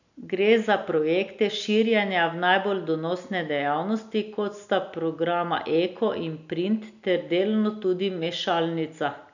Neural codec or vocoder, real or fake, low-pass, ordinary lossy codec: none; real; 7.2 kHz; none